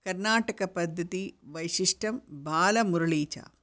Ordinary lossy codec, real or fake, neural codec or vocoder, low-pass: none; real; none; none